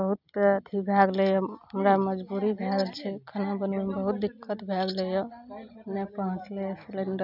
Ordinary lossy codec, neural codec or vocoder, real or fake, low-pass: none; none; real; 5.4 kHz